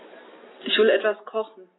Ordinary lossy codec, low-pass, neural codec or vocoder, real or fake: AAC, 16 kbps; 7.2 kHz; none; real